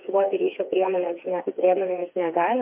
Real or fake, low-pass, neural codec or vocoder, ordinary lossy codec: fake; 3.6 kHz; codec, 16 kHz, 4 kbps, FreqCodec, smaller model; MP3, 32 kbps